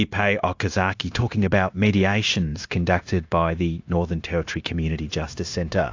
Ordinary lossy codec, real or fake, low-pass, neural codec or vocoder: AAC, 48 kbps; fake; 7.2 kHz; codec, 16 kHz, 0.9 kbps, LongCat-Audio-Codec